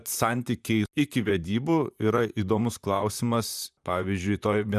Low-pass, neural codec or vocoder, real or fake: 14.4 kHz; vocoder, 44.1 kHz, 128 mel bands, Pupu-Vocoder; fake